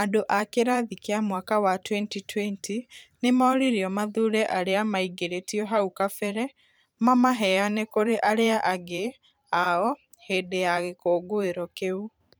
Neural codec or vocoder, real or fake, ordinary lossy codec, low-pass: vocoder, 44.1 kHz, 128 mel bands every 512 samples, BigVGAN v2; fake; none; none